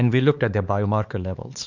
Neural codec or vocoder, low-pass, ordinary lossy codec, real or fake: codec, 16 kHz, 4 kbps, X-Codec, HuBERT features, trained on LibriSpeech; 7.2 kHz; Opus, 64 kbps; fake